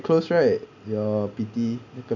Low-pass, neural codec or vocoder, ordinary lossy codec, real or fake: 7.2 kHz; none; none; real